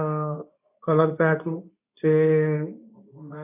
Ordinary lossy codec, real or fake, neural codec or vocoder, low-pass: AAC, 32 kbps; fake; codec, 24 kHz, 0.9 kbps, WavTokenizer, medium speech release version 2; 3.6 kHz